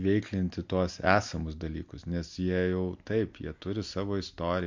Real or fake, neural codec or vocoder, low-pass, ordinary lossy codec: real; none; 7.2 kHz; MP3, 48 kbps